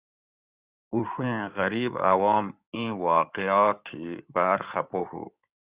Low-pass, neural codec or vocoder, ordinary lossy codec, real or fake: 3.6 kHz; codec, 16 kHz in and 24 kHz out, 2.2 kbps, FireRedTTS-2 codec; Opus, 64 kbps; fake